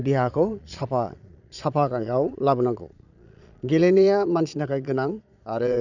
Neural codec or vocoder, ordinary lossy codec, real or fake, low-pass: vocoder, 22.05 kHz, 80 mel bands, Vocos; none; fake; 7.2 kHz